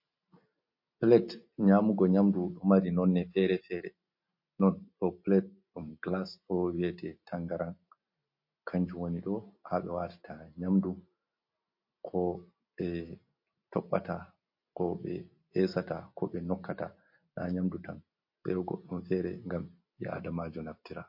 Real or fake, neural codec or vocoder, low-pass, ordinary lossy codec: real; none; 5.4 kHz; MP3, 32 kbps